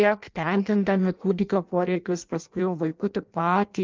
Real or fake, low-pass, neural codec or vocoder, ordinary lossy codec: fake; 7.2 kHz; codec, 16 kHz in and 24 kHz out, 0.6 kbps, FireRedTTS-2 codec; Opus, 24 kbps